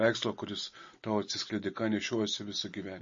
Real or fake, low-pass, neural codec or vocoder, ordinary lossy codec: real; 7.2 kHz; none; MP3, 32 kbps